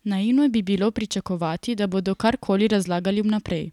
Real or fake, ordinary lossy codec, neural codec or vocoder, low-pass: real; none; none; 19.8 kHz